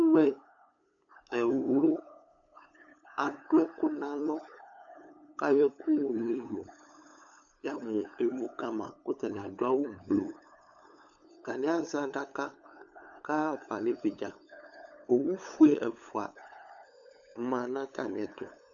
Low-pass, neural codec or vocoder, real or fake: 7.2 kHz; codec, 16 kHz, 8 kbps, FunCodec, trained on LibriTTS, 25 frames a second; fake